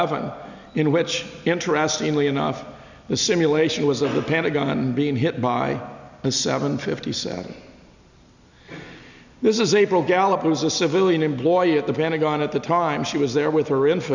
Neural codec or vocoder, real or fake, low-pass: none; real; 7.2 kHz